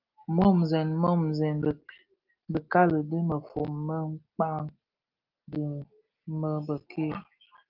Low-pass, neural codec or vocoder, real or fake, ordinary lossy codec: 5.4 kHz; none; real; Opus, 24 kbps